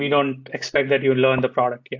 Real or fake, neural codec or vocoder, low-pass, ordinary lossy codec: real; none; 7.2 kHz; AAC, 48 kbps